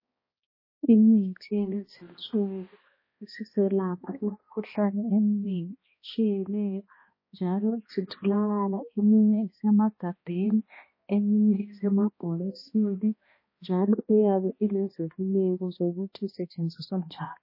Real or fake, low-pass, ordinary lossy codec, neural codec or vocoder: fake; 5.4 kHz; MP3, 24 kbps; codec, 16 kHz, 1 kbps, X-Codec, HuBERT features, trained on balanced general audio